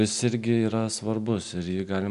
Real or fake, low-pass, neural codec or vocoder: real; 10.8 kHz; none